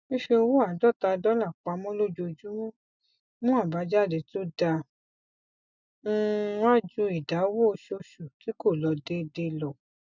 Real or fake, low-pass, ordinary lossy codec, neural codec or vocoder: real; 7.2 kHz; none; none